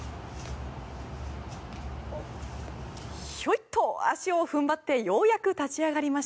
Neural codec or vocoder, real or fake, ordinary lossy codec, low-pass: none; real; none; none